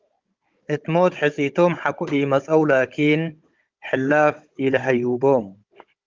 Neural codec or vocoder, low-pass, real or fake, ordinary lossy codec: codec, 16 kHz, 16 kbps, FunCodec, trained on Chinese and English, 50 frames a second; 7.2 kHz; fake; Opus, 32 kbps